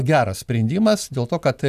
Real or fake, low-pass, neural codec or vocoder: real; 14.4 kHz; none